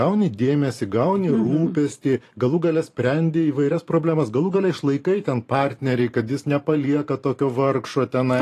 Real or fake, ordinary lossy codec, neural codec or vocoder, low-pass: fake; AAC, 48 kbps; vocoder, 44.1 kHz, 128 mel bands every 512 samples, BigVGAN v2; 14.4 kHz